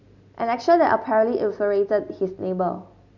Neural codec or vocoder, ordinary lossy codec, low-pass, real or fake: none; none; 7.2 kHz; real